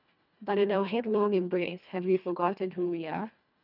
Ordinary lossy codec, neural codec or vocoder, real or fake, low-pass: none; codec, 24 kHz, 1.5 kbps, HILCodec; fake; 5.4 kHz